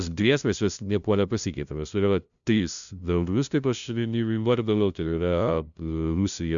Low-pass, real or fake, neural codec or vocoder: 7.2 kHz; fake; codec, 16 kHz, 0.5 kbps, FunCodec, trained on LibriTTS, 25 frames a second